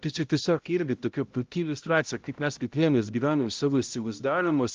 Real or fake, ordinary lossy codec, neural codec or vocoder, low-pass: fake; Opus, 32 kbps; codec, 16 kHz, 0.5 kbps, X-Codec, HuBERT features, trained on balanced general audio; 7.2 kHz